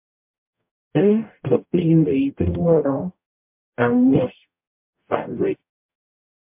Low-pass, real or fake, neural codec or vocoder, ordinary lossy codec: 3.6 kHz; fake; codec, 44.1 kHz, 0.9 kbps, DAC; MP3, 32 kbps